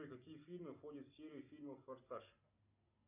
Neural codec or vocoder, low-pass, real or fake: none; 3.6 kHz; real